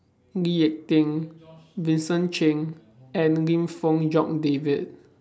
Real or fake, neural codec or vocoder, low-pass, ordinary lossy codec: real; none; none; none